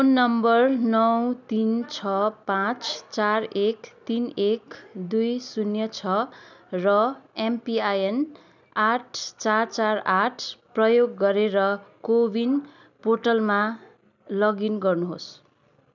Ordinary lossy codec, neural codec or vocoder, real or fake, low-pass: none; none; real; 7.2 kHz